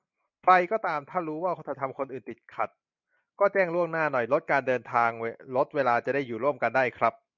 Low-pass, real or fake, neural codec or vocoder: 7.2 kHz; real; none